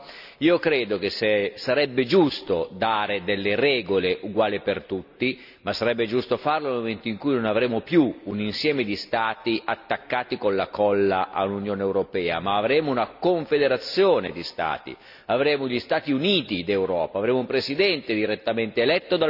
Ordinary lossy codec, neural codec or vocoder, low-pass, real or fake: none; none; 5.4 kHz; real